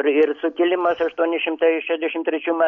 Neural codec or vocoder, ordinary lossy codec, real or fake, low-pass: none; MP3, 48 kbps; real; 7.2 kHz